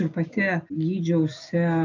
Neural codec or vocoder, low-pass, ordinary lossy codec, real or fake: none; 7.2 kHz; AAC, 48 kbps; real